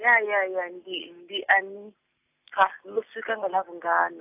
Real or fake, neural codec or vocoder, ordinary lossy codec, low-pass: real; none; AAC, 32 kbps; 3.6 kHz